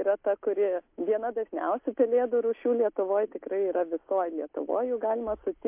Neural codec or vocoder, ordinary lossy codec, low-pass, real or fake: none; MP3, 32 kbps; 3.6 kHz; real